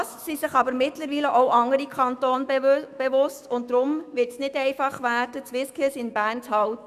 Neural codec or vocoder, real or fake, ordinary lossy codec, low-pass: autoencoder, 48 kHz, 128 numbers a frame, DAC-VAE, trained on Japanese speech; fake; none; 14.4 kHz